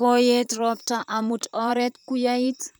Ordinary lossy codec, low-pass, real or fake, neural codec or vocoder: none; none; fake; vocoder, 44.1 kHz, 128 mel bands, Pupu-Vocoder